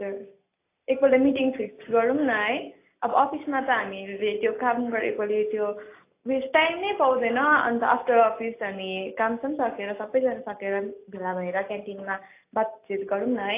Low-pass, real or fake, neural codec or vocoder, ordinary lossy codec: 3.6 kHz; real; none; AAC, 24 kbps